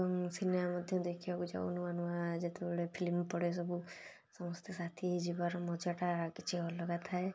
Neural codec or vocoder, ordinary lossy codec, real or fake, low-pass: none; none; real; none